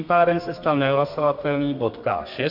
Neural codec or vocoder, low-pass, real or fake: codec, 32 kHz, 1.9 kbps, SNAC; 5.4 kHz; fake